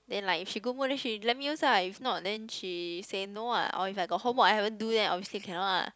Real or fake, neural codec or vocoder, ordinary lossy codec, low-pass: real; none; none; none